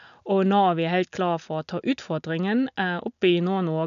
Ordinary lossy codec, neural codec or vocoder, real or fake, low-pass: none; none; real; 7.2 kHz